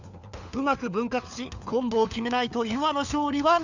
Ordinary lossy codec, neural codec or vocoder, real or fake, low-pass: none; codec, 16 kHz, 4 kbps, FunCodec, trained on LibriTTS, 50 frames a second; fake; 7.2 kHz